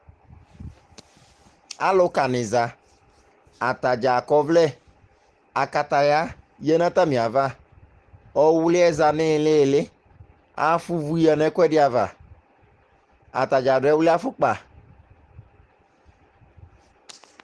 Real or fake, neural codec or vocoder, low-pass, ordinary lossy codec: real; none; 10.8 kHz; Opus, 16 kbps